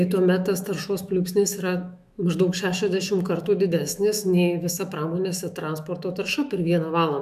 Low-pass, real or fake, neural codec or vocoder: 14.4 kHz; fake; autoencoder, 48 kHz, 128 numbers a frame, DAC-VAE, trained on Japanese speech